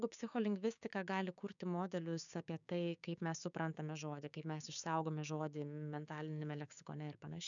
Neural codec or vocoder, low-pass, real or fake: codec, 16 kHz, 6 kbps, DAC; 7.2 kHz; fake